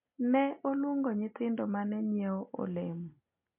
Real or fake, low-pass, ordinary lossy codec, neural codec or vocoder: real; 3.6 kHz; MP3, 32 kbps; none